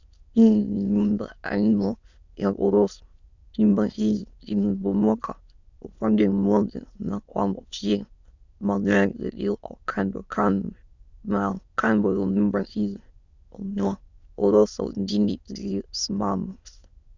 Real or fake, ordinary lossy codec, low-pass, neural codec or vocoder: fake; Opus, 64 kbps; 7.2 kHz; autoencoder, 22.05 kHz, a latent of 192 numbers a frame, VITS, trained on many speakers